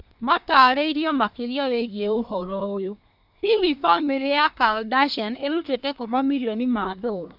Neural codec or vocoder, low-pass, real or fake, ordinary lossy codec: codec, 24 kHz, 1 kbps, SNAC; 5.4 kHz; fake; none